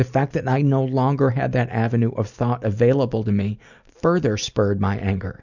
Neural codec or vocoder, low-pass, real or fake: none; 7.2 kHz; real